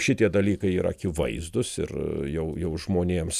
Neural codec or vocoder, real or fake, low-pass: none; real; 14.4 kHz